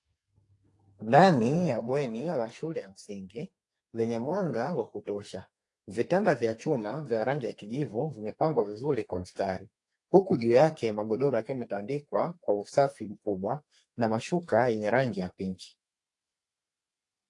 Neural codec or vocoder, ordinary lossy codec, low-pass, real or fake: codec, 32 kHz, 1.9 kbps, SNAC; AAC, 48 kbps; 10.8 kHz; fake